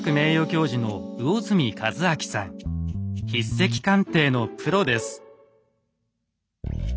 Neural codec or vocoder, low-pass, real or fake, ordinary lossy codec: none; none; real; none